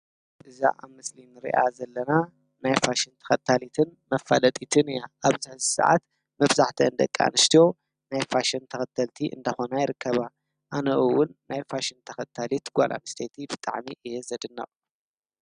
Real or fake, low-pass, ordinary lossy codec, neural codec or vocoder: real; 9.9 kHz; Opus, 64 kbps; none